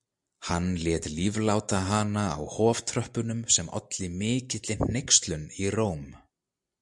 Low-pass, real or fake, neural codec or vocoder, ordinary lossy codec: 10.8 kHz; real; none; MP3, 96 kbps